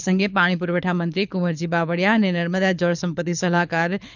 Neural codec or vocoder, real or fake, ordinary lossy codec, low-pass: codec, 24 kHz, 6 kbps, HILCodec; fake; none; 7.2 kHz